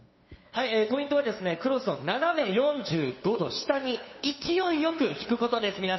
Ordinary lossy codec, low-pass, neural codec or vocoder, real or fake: MP3, 24 kbps; 7.2 kHz; codec, 16 kHz, 2 kbps, FunCodec, trained on LibriTTS, 25 frames a second; fake